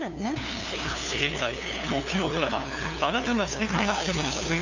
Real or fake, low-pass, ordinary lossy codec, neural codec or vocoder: fake; 7.2 kHz; none; codec, 16 kHz, 2 kbps, FunCodec, trained on LibriTTS, 25 frames a second